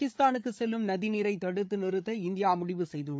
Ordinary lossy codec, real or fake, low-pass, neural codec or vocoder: none; fake; none; codec, 16 kHz, 8 kbps, FreqCodec, larger model